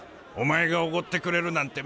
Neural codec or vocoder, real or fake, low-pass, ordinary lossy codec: none; real; none; none